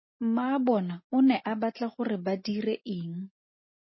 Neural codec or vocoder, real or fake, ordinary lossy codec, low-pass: none; real; MP3, 24 kbps; 7.2 kHz